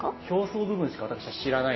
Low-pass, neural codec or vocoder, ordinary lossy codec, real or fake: 7.2 kHz; none; MP3, 24 kbps; real